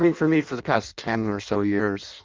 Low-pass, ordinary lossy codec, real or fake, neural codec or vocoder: 7.2 kHz; Opus, 32 kbps; fake; codec, 16 kHz in and 24 kHz out, 0.6 kbps, FireRedTTS-2 codec